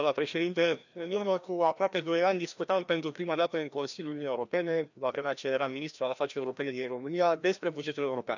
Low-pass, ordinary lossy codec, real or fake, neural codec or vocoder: 7.2 kHz; none; fake; codec, 16 kHz, 1 kbps, FreqCodec, larger model